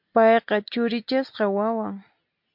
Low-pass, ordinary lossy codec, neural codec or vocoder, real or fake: 5.4 kHz; MP3, 48 kbps; none; real